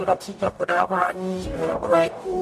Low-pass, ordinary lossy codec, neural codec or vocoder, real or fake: 14.4 kHz; MP3, 64 kbps; codec, 44.1 kHz, 0.9 kbps, DAC; fake